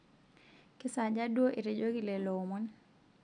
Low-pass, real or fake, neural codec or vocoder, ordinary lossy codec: 9.9 kHz; real; none; none